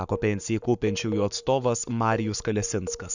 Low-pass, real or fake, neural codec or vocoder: 7.2 kHz; fake; codec, 44.1 kHz, 7.8 kbps, Pupu-Codec